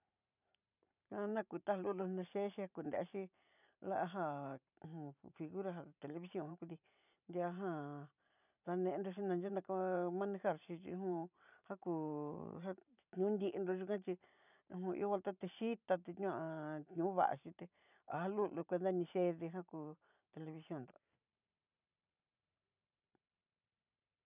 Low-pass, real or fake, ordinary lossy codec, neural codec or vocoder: 3.6 kHz; fake; none; vocoder, 44.1 kHz, 128 mel bands every 512 samples, BigVGAN v2